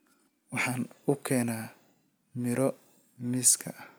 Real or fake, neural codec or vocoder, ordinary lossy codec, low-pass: real; none; none; none